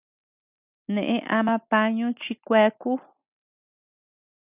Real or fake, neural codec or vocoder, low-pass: real; none; 3.6 kHz